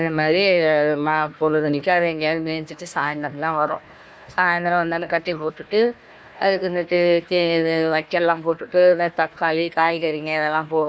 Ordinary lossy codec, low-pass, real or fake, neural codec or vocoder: none; none; fake; codec, 16 kHz, 1 kbps, FunCodec, trained on Chinese and English, 50 frames a second